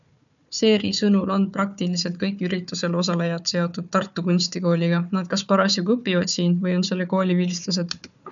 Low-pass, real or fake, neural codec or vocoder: 7.2 kHz; fake; codec, 16 kHz, 4 kbps, FunCodec, trained on Chinese and English, 50 frames a second